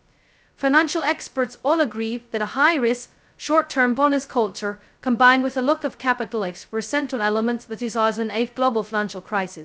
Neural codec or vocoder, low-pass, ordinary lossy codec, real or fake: codec, 16 kHz, 0.2 kbps, FocalCodec; none; none; fake